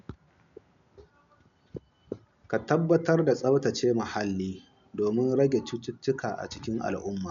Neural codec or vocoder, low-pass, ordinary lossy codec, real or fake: none; 7.2 kHz; MP3, 96 kbps; real